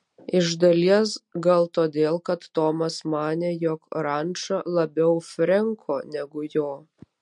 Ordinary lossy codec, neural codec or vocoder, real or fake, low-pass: MP3, 48 kbps; none; real; 10.8 kHz